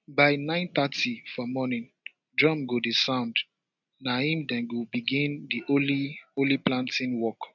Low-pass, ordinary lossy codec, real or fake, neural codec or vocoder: 7.2 kHz; none; real; none